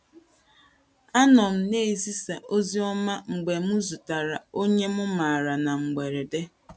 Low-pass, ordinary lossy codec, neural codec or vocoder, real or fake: none; none; none; real